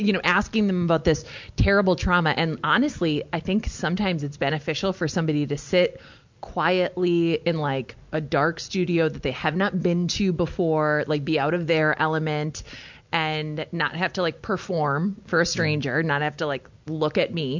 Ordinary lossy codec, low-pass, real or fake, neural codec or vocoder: MP3, 64 kbps; 7.2 kHz; real; none